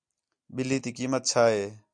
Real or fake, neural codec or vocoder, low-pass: real; none; 9.9 kHz